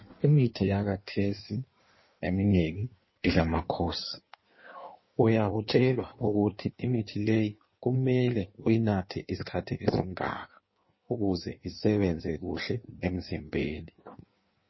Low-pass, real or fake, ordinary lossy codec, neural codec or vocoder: 7.2 kHz; fake; MP3, 24 kbps; codec, 16 kHz in and 24 kHz out, 1.1 kbps, FireRedTTS-2 codec